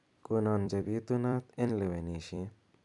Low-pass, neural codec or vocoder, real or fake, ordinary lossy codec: 10.8 kHz; vocoder, 44.1 kHz, 128 mel bands every 256 samples, BigVGAN v2; fake; none